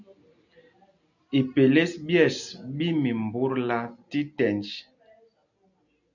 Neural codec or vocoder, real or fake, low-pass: none; real; 7.2 kHz